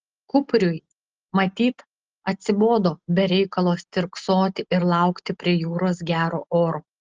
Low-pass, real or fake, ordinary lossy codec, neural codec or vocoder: 7.2 kHz; real; Opus, 16 kbps; none